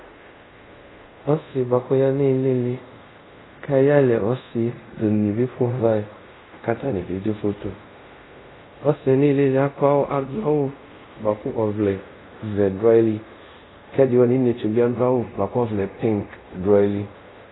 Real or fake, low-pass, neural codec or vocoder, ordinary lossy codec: fake; 7.2 kHz; codec, 24 kHz, 0.5 kbps, DualCodec; AAC, 16 kbps